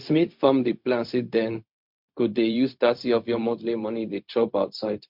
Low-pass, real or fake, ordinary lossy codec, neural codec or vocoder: 5.4 kHz; fake; MP3, 48 kbps; codec, 16 kHz, 0.4 kbps, LongCat-Audio-Codec